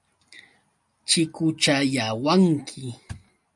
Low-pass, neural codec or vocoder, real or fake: 10.8 kHz; none; real